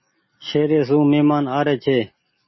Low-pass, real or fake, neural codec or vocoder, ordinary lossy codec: 7.2 kHz; real; none; MP3, 24 kbps